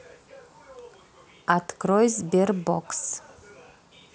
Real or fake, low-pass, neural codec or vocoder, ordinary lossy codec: real; none; none; none